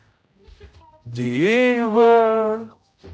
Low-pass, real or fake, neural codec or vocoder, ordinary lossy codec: none; fake; codec, 16 kHz, 0.5 kbps, X-Codec, HuBERT features, trained on general audio; none